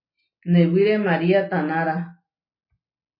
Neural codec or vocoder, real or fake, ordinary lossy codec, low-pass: none; real; MP3, 24 kbps; 5.4 kHz